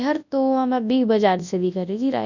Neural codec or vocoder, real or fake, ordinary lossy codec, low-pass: codec, 24 kHz, 0.9 kbps, WavTokenizer, large speech release; fake; none; 7.2 kHz